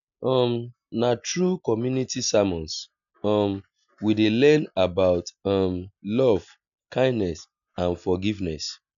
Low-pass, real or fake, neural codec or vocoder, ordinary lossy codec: 7.2 kHz; real; none; none